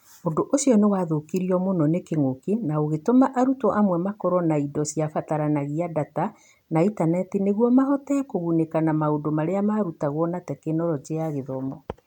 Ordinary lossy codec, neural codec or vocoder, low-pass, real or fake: none; none; 19.8 kHz; real